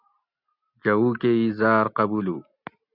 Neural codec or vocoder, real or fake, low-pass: none; real; 5.4 kHz